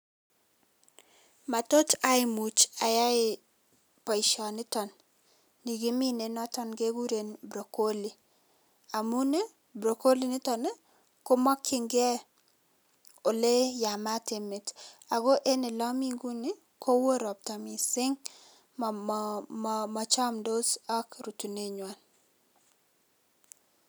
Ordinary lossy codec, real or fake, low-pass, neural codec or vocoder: none; real; none; none